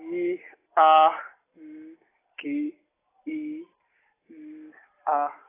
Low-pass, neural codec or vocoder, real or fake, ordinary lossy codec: 3.6 kHz; none; real; AAC, 16 kbps